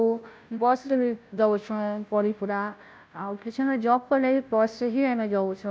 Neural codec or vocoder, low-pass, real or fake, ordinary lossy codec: codec, 16 kHz, 0.5 kbps, FunCodec, trained on Chinese and English, 25 frames a second; none; fake; none